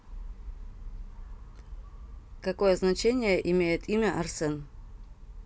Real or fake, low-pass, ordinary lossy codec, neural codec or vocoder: real; none; none; none